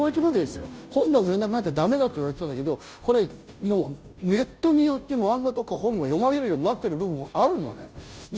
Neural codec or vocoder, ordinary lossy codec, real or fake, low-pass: codec, 16 kHz, 0.5 kbps, FunCodec, trained on Chinese and English, 25 frames a second; none; fake; none